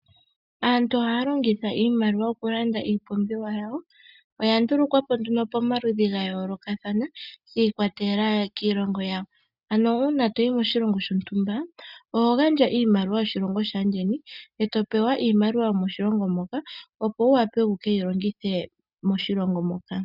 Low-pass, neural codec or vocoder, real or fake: 5.4 kHz; none; real